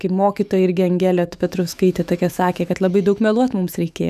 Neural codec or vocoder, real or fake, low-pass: autoencoder, 48 kHz, 128 numbers a frame, DAC-VAE, trained on Japanese speech; fake; 14.4 kHz